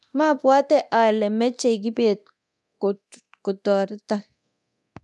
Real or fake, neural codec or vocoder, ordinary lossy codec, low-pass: fake; codec, 24 kHz, 0.9 kbps, DualCodec; none; none